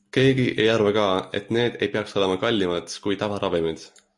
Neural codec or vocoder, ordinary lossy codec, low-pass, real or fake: none; MP3, 48 kbps; 10.8 kHz; real